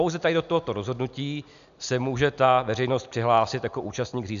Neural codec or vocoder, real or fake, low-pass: none; real; 7.2 kHz